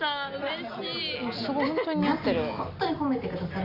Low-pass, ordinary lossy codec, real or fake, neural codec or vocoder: 5.4 kHz; none; real; none